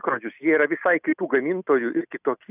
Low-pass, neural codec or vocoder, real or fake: 3.6 kHz; none; real